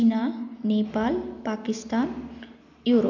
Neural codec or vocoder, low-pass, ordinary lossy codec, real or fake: none; 7.2 kHz; none; real